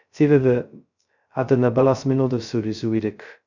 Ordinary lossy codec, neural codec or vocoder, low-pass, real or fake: none; codec, 16 kHz, 0.2 kbps, FocalCodec; 7.2 kHz; fake